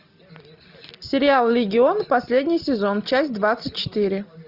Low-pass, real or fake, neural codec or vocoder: 5.4 kHz; real; none